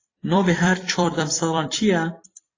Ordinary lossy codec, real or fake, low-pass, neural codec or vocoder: AAC, 32 kbps; fake; 7.2 kHz; vocoder, 44.1 kHz, 128 mel bands every 256 samples, BigVGAN v2